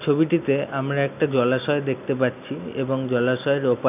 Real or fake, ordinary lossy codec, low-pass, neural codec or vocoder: real; none; 3.6 kHz; none